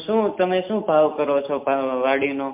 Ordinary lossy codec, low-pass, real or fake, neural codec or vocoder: MP3, 32 kbps; 3.6 kHz; real; none